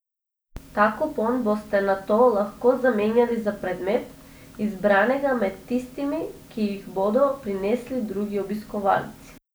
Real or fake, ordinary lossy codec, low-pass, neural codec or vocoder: real; none; none; none